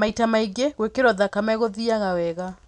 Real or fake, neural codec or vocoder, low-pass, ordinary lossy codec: real; none; 10.8 kHz; none